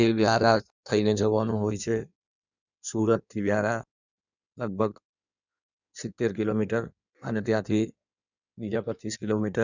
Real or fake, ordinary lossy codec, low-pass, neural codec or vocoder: fake; none; 7.2 kHz; codec, 16 kHz in and 24 kHz out, 1.1 kbps, FireRedTTS-2 codec